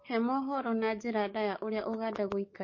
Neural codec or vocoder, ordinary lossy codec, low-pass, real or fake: codec, 44.1 kHz, 7.8 kbps, DAC; MP3, 32 kbps; 7.2 kHz; fake